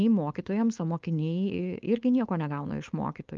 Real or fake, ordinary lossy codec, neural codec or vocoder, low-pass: fake; Opus, 32 kbps; codec, 16 kHz, 4.8 kbps, FACodec; 7.2 kHz